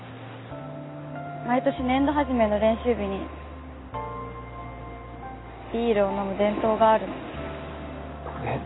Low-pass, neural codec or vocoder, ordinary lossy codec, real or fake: 7.2 kHz; none; AAC, 16 kbps; real